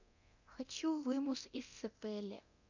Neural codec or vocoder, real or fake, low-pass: codec, 24 kHz, 0.9 kbps, DualCodec; fake; 7.2 kHz